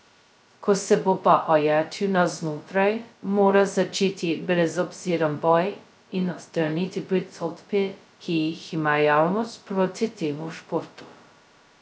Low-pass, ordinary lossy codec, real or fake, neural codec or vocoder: none; none; fake; codec, 16 kHz, 0.2 kbps, FocalCodec